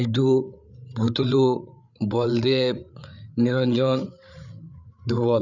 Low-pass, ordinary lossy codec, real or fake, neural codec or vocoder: 7.2 kHz; none; fake; codec, 16 kHz, 8 kbps, FreqCodec, larger model